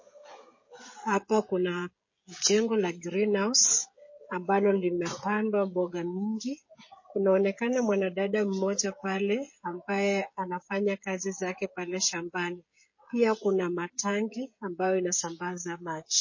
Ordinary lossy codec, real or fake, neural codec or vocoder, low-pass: MP3, 32 kbps; fake; codec, 16 kHz, 16 kbps, FreqCodec, smaller model; 7.2 kHz